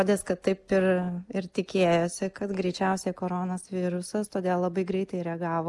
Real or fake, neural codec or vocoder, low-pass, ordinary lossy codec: real; none; 10.8 kHz; Opus, 24 kbps